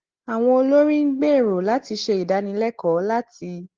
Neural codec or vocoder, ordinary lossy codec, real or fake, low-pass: none; Opus, 16 kbps; real; 7.2 kHz